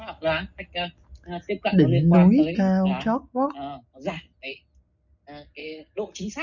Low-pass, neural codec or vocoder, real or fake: 7.2 kHz; none; real